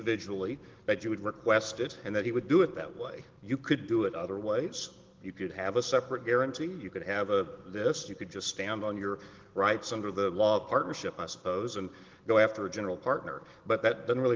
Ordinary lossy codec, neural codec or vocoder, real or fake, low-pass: Opus, 16 kbps; none; real; 7.2 kHz